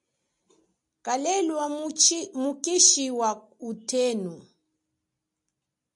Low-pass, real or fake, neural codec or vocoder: 10.8 kHz; real; none